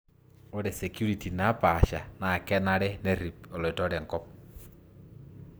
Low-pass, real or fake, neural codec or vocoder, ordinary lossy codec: none; real; none; none